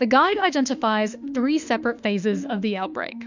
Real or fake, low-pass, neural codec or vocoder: fake; 7.2 kHz; autoencoder, 48 kHz, 32 numbers a frame, DAC-VAE, trained on Japanese speech